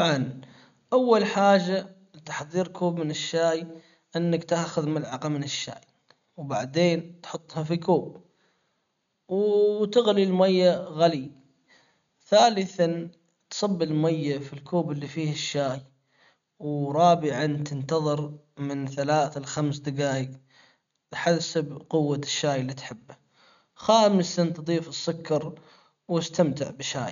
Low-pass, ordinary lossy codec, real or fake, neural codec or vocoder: 7.2 kHz; none; real; none